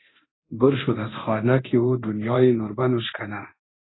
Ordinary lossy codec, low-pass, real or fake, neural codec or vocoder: AAC, 16 kbps; 7.2 kHz; fake; codec, 24 kHz, 0.9 kbps, DualCodec